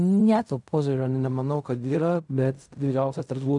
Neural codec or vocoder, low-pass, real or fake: codec, 16 kHz in and 24 kHz out, 0.4 kbps, LongCat-Audio-Codec, fine tuned four codebook decoder; 10.8 kHz; fake